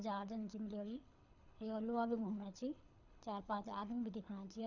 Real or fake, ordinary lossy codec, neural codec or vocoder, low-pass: fake; none; codec, 24 kHz, 6 kbps, HILCodec; 7.2 kHz